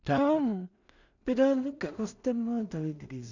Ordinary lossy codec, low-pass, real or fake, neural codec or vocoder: none; 7.2 kHz; fake; codec, 16 kHz in and 24 kHz out, 0.4 kbps, LongCat-Audio-Codec, two codebook decoder